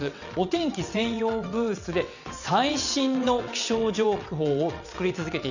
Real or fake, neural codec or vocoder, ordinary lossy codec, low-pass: fake; vocoder, 22.05 kHz, 80 mel bands, WaveNeXt; none; 7.2 kHz